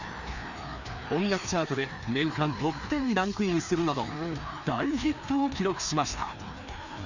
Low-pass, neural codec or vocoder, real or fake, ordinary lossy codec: 7.2 kHz; codec, 16 kHz, 2 kbps, FreqCodec, larger model; fake; none